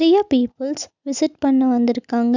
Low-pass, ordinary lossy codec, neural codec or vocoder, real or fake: 7.2 kHz; none; none; real